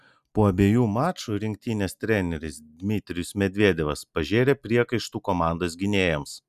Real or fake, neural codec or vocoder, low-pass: real; none; 14.4 kHz